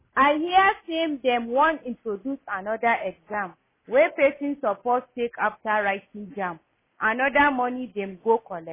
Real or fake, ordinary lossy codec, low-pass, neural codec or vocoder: real; MP3, 16 kbps; 3.6 kHz; none